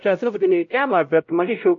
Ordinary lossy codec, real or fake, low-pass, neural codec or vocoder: MP3, 48 kbps; fake; 7.2 kHz; codec, 16 kHz, 0.5 kbps, X-Codec, WavLM features, trained on Multilingual LibriSpeech